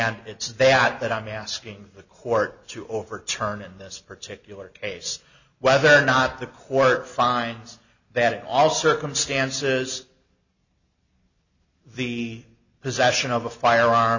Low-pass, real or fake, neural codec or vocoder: 7.2 kHz; real; none